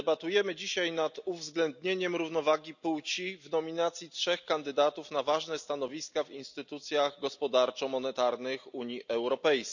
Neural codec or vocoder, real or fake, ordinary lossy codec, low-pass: none; real; none; 7.2 kHz